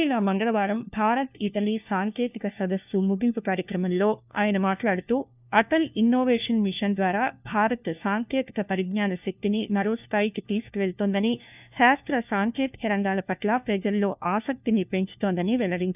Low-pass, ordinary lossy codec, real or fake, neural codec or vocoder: 3.6 kHz; none; fake; codec, 16 kHz, 1 kbps, FunCodec, trained on LibriTTS, 50 frames a second